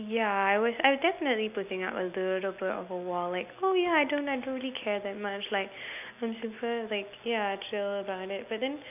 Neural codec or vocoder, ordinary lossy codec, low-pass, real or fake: none; none; 3.6 kHz; real